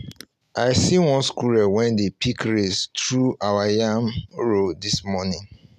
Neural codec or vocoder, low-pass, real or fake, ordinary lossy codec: none; 14.4 kHz; real; none